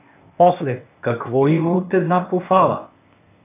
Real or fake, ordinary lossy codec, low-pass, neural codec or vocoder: fake; AAC, 24 kbps; 3.6 kHz; codec, 16 kHz, 0.8 kbps, ZipCodec